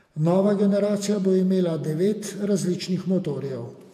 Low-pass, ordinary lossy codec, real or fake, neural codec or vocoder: 14.4 kHz; AAC, 96 kbps; fake; vocoder, 48 kHz, 128 mel bands, Vocos